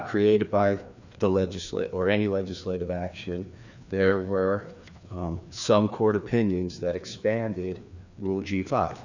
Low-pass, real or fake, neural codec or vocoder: 7.2 kHz; fake; codec, 16 kHz, 2 kbps, FreqCodec, larger model